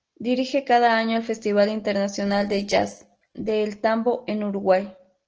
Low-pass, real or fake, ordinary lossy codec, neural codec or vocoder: 7.2 kHz; real; Opus, 16 kbps; none